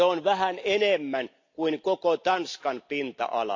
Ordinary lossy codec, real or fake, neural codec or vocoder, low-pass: AAC, 48 kbps; real; none; 7.2 kHz